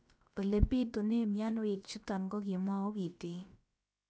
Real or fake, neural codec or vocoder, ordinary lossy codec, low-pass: fake; codec, 16 kHz, about 1 kbps, DyCAST, with the encoder's durations; none; none